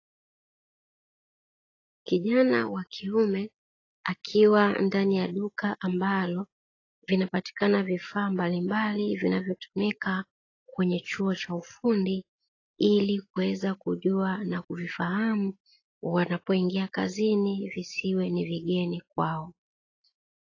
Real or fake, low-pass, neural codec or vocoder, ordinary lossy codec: real; 7.2 kHz; none; AAC, 32 kbps